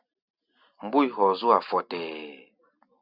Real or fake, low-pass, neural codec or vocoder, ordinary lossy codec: real; 5.4 kHz; none; Opus, 64 kbps